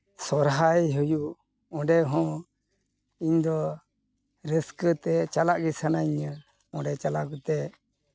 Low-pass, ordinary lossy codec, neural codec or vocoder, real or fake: none; none; none; real